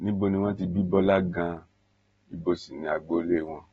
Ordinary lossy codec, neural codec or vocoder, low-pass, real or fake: AAC, 24 kbps; none; 9.9 kHz; real